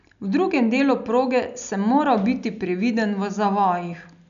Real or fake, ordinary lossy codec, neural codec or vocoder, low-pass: real; none; none; 7.2 kHz